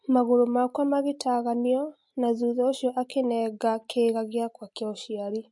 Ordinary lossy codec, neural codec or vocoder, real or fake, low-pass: MP3, 48 kbps; none; real; 10.8 kHz